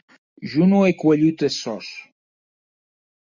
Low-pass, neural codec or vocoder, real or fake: 7.2 kHz; none; real